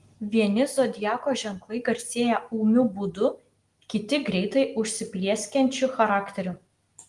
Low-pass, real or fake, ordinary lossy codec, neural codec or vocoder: 10.8 kHz; real; Opus, 24 kbps; none